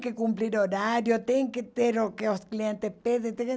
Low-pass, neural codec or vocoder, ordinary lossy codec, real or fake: none; none; none; real